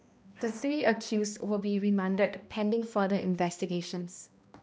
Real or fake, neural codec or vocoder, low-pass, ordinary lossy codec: fake; codec, 16 kHz, 1 kbps, X-Codec, HuBERT features, trained on balanced general audio; none; none